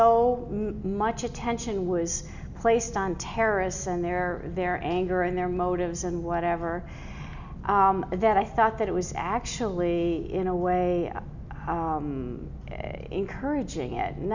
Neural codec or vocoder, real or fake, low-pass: none; real; 7.2 kHz